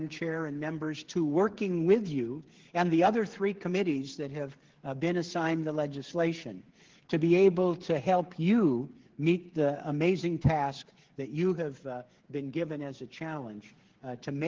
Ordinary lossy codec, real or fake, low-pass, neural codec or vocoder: Opus, 16 kbps; fake; 7.2 kHz; codec, 16 kHz, 8 kbps, FreqCodec, smaller model